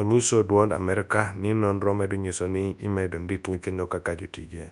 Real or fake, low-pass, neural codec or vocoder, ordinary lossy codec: fake; 10.8 kHz; codec, 24 kHz, 0.9 kbps, WavTokenizer, large speech release; none